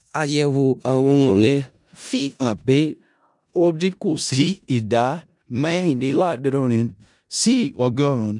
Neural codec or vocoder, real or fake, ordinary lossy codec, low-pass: codec, 16 kHz in and 24 kHz out, 0.4 kbps, LongCat-Audio-Codec, four codebook decoder; fake; none; 10.8 kHz